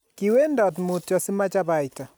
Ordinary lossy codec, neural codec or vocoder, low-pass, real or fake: none; none; none; real